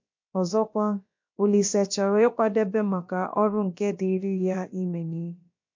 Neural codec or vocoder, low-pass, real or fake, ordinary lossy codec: codec, 16 kHz, about 1 kbps, DyCAST, with the encoder's durations; 7.2 kHz; fake; MP3, 48 kbps